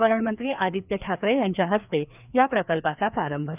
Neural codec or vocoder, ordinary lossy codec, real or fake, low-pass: codec, 16 kHz, 2 kbps, FreqCodec, larger model; none; fake; 3.6 kHz